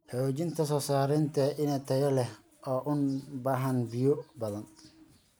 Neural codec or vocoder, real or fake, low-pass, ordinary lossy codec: none; real; none; none